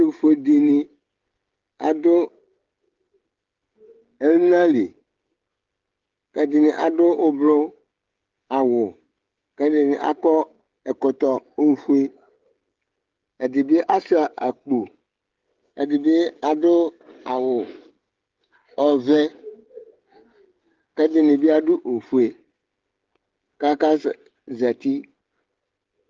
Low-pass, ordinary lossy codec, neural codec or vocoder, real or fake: 7.2 kHz; Opus, 16 kbps; codec, 16 kHz, 16 kbps, FreqCodec, smaller model; fake